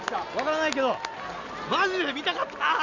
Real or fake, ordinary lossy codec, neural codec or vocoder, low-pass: real; none; none; 7.2 kHz